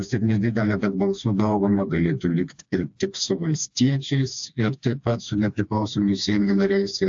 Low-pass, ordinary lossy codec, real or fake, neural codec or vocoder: 7.2 kHz; AAC, 64 kbps; fake; codec, 16 kHz, 2 kbps, FreqCodec, smaller model